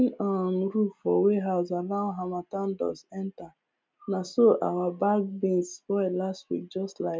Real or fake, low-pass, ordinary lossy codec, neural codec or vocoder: real; none; none; none